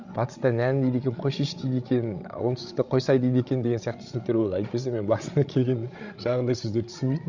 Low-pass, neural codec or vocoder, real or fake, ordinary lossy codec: 7.2 kHz; codec, 16 kHz, 16 kbps, FreqCodec, larger model; fake; Opus, 64 kbps